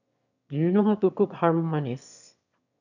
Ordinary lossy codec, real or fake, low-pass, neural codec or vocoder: none; fake; 7.2 kHz; autoencoder, 22.05 kHz, a latent of 192 numbers a frame, VITS, trained on one speaker